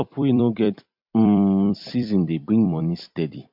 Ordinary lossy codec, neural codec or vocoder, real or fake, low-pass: MP3, 48 kbps; vocoder, 44.1 kHz, 128 mel bands every 256 samples, BigVGAN v2; fake; 5.4 kHz